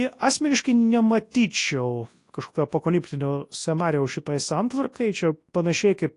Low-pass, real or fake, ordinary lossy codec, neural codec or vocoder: 10.8 kHz; fake; AAC, 48 kbps; codec, 24 kHz, 0.9 kbps, WavTokenizer, large speech release